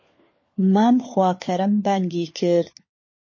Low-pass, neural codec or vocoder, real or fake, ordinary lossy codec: 7.2 kHz; codec, 16 kHz, 4 kbps, FunCodec, trained on LibriTTS, 50 frames a second; fake; MP3, 32 kbps